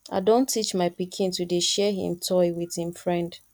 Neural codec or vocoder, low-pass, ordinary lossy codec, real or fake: none; 19.8 kHz; none; real